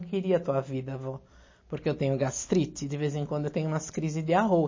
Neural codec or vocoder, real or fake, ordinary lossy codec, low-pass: none; real; MP3, 32 kbps; 7.2 kHz